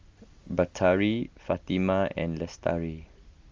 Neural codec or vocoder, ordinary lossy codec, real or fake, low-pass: none; Opus, 32 kbps; real; 7.2 kHz